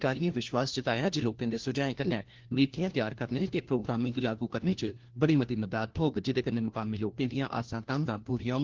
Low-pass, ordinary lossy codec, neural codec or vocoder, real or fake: 7.2 kHz; Opus, 16 kbps; codec, 16 kHz, 1 kbps, FunCodec, trained on LibriTTS, 50 frames a second; fake